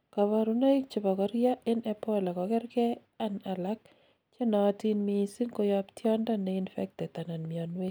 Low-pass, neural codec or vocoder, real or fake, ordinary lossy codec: none; none; real; none